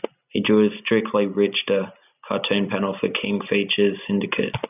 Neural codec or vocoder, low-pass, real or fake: none; 3.6 kHz; real